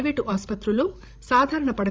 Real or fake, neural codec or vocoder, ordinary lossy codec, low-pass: fake; codec, 16 kHz, 16 kbps, FreqCodec, larger model; none; none